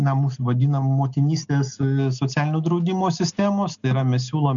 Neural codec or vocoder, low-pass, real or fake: none; 7.2 kHz; real